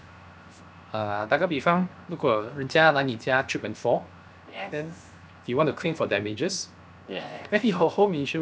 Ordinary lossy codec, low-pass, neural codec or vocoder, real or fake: none; none; codec, 16 kHz, 0.7 kbps, FocalCodec; fake